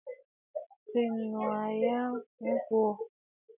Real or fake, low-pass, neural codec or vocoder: real; 3.6 kHz; none